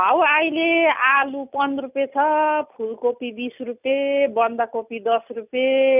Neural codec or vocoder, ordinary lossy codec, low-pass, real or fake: none; none; 3.6 kHz; real